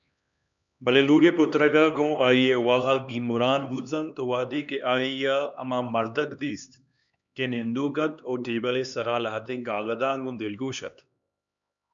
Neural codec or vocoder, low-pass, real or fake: codec, 16 kHz, 2 kbps, X-Codec, HuBERT features, trained on LibriSpeech; 7.2 kHz; fake